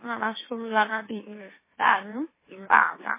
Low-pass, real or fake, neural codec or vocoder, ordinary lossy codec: 3.6 kHz; fake; autoencoder, 44.1 kHz, a latent of 192 numbers a frame, MeloTTS; MP3, 16 kbps